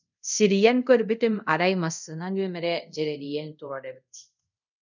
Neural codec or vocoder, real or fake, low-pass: codec, 24 kHz, 0.5 kbps, DualCodec; fake; 7.2 kHz